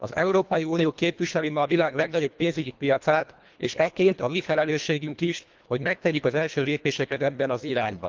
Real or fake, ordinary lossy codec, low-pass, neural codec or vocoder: fake; Opus, 24 kbps; 7.2 kHz; codec, 24 kHz, 1.5 kbps, HILCodec